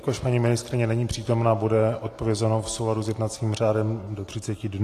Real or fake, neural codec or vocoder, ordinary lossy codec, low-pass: real; none; AAC, 48 kbps; 14.4 kHz